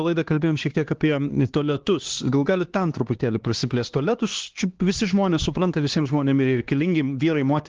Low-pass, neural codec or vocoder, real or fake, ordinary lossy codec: 7.2 kHz; codec, 16 kHz, 2 kbps, X-Codec, HuBERT features, trained on LibriSpeech; fake; Opus, 16 kbps